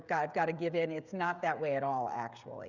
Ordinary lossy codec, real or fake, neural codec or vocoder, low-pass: Opus, 64 kbps; fake; codec, 16 kHz, 16 kbps, FreqCodec, smaller model; 7.2 kHz